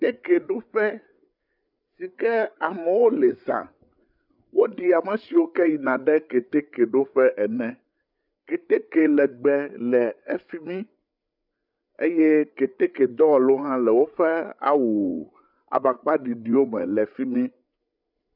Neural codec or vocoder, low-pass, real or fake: codec, 16 kHz, 8 kbps, FreqCodec, larger model; 5.4 kHz; fake